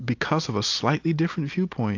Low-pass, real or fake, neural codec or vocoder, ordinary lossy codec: 7.2 kHz; real; none; AAC, 48 kbps